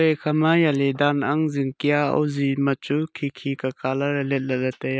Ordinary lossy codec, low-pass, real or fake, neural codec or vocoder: none; none; real; none